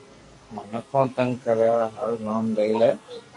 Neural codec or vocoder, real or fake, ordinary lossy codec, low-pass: codec, 44.1 kHz, 2.6 kbps, SNAC; fake; MP3, 48 kbps; 10.8 kHz